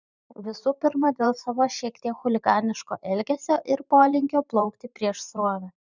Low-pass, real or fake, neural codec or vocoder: 7.2 kHz; fake; vocoder, 44.1 kHz, 128 mel bands every 512 samples, BigVGAN v2